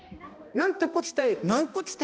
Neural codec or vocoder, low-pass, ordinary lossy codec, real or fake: codec, 16 kHz, 1 kbps, X-Codec, HuBERT features, trained on balanced general audio; none; none; fake